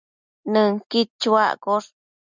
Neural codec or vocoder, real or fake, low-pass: none; real; 7.2 kHz